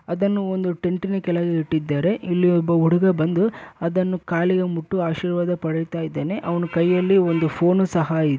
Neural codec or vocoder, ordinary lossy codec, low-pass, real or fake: none; none; none; real